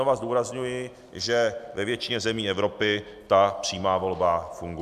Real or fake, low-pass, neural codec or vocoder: real; 14.4 kHz; none